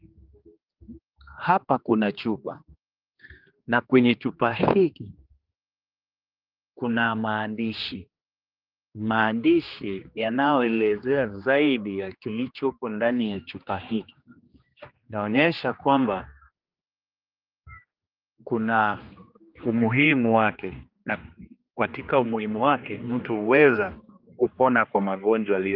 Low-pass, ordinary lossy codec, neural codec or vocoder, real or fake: 5.4 kHz; Opus, 24 kbps; codec, 16 kHz, 2 kbps, X-Codec, HuBERT features, trained on general audio; fake